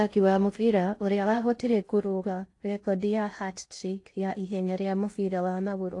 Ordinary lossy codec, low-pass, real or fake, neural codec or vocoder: AAC, 48 kbps; 10.8 kHz; fake; codec, 16 kHz in and 24 kHz out, 0.6 kbps, FocalCodec, streaming, 4096 codes